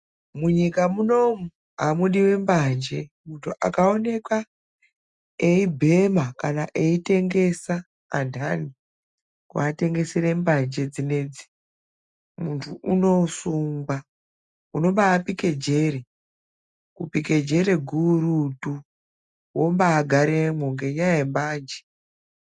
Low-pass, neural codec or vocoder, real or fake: 10.8 kHz; none; real